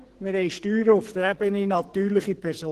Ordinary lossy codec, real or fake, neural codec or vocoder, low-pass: Opus, 16 kbps; fake; codec, 44.1 kHz, 2.6 kbps, SNAC; 14.4 kHz